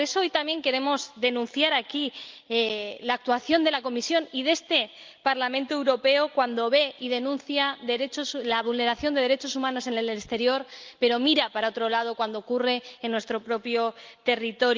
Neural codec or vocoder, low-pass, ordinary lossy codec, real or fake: none; 7.2 kHz; Opus, 24 kbps; real